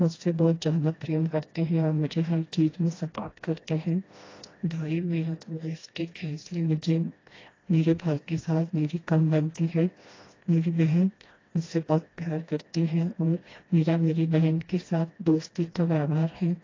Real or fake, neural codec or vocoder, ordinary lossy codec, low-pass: fake; codec, 16 kHz, 1 kbps, FreqCodec, smaller model; AAC, 32 kbps; 7.2 kHz